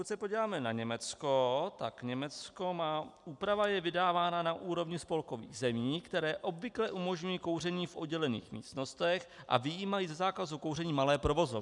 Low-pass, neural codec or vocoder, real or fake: 10.8 kHz; none; real